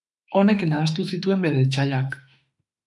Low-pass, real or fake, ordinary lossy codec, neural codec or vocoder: 10.8 kHz; fake; MP3, 96 kbps; autoencoder, 48 kHz, 32 numbers a frame, DAC-VAE, trained on Japanese speech